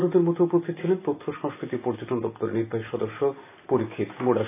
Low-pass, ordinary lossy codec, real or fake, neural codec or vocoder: 3.6 kHz; AAC, 24 kbps; real; none